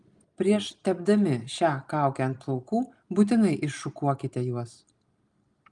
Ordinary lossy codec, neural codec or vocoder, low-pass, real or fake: Opus, 32 kbps; none; 10.8 kHz; real